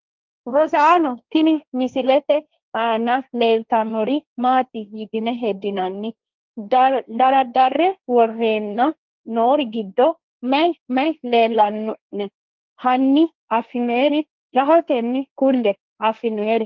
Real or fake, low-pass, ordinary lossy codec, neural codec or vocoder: fake; 7.2 kHz; Opus, 32 kbps; codec, 16 kHz, 1.1 kbps, Voila-Tokenizer